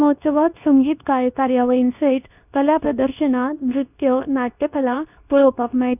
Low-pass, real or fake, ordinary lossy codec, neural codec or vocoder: 3.6 kHz; fake; none; codec, 24 kHz, 0.9 kbps, WavTokenizer, medium speech release version 2